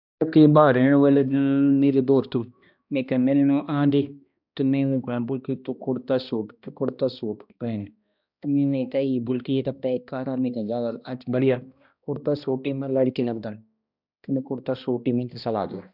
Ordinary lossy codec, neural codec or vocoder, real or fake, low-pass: none; codec, 16 kHz, 1 kbps, X-Codec, HuBERT features, trained on balanced general audio; fake; 5.4 kHz